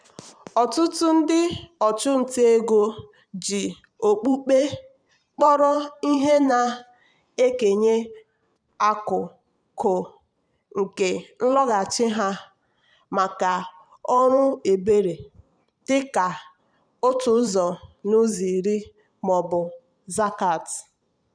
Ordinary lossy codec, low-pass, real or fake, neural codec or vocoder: none; 9.9 kHz; fake; vocoder, 44.1 kHz, 128 mel bands every 512 samples, BigVGAN v2